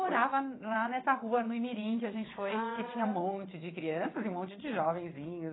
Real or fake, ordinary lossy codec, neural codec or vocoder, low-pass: fake; AAC, 16 kbps; vocoder, 22.05 kHz, 80 mel bands, WaveNeXt; 7.2 kHz